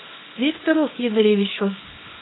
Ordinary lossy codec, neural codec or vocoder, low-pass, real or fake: AAC, 16 kbps; codec, 24 kHz, 0.9 kbps, WavTokenizer, small release; 7.2 kHz; fake